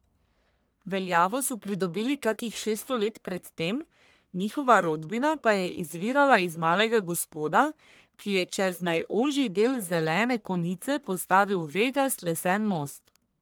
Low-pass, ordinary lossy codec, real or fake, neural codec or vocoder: none; none; fake; codec, 44.1 kHz, 1.7 kbps, Pupu-Codec